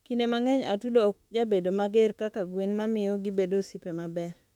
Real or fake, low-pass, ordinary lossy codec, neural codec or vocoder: fake; 19.8 kHz; MP3, 96 kbps; autoencoder, 48 kHz, 32 numbers a frame, DAC-VAE, trained on Japanese speech